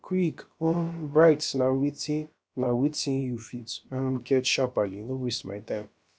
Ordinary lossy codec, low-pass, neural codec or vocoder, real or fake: none; none; codec, 16 kHz, about 1 kbps, DyCAST, with the encoder's durations; fake